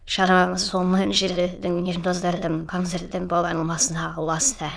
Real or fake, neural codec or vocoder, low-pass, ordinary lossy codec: fake; autoencoder, 22.05 kHz, a latent of 192 numbers a frame, VITS, trained on many speakers; none; none